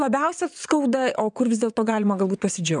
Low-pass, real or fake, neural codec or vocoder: 9.9 kHz; fake; vocoder, 22.05 kHz, 80 mel bands, WaveNeXt